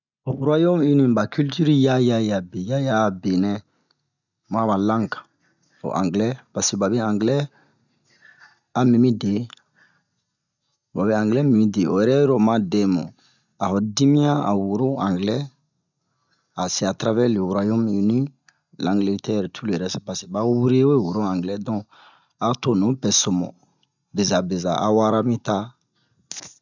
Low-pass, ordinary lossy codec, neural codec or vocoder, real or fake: 7.2 kHz; none; none; real